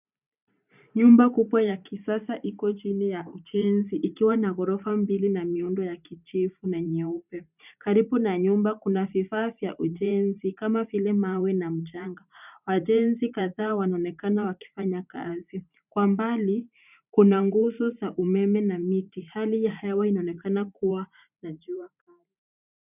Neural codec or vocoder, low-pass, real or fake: vocoder, 44.1 kHz, 128 mel bands every 512 samples, BigVGAN v2; 3.6 kHz; fake